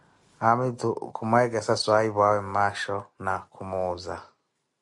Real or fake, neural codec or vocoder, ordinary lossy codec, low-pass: real; none; AAC, 64 kbps; 10.8 kHz